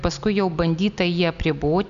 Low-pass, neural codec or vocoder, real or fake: 7.2 kHz; none; real